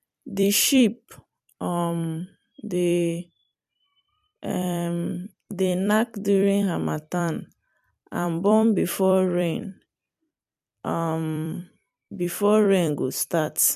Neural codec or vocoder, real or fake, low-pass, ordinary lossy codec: vocoder, 44.1 kHz, 128 mel bands every 256 samples, BigVGAN v2; fake; 14.4 kHz; MP3, 96 kbps